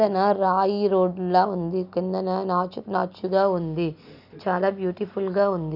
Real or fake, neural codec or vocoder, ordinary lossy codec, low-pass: real; none; none; 5.4 kHz